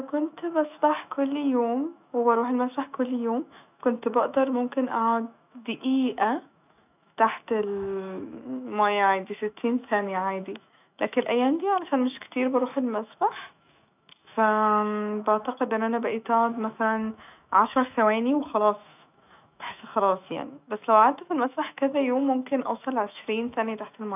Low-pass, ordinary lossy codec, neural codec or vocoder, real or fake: 3.6 kHz; none; autoencoder, 48 kHz, 128 numbers a frame, DAC-VAE, trained on Japanese speech; fake